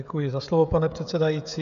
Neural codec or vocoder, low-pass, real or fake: codec, 16 kHz, 16 kbps, FreqCodec, smaller model; 7.2 kHz; fake